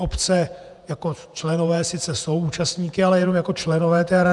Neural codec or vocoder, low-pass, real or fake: vocoder, 48 kHz, 128 mel bands, Vocos; 10.8 kHz; fake